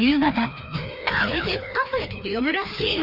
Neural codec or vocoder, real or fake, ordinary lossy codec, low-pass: codec, 16 kHz, 2 kbps, FreqCodec, larger model; fake; none; 5.4 kHz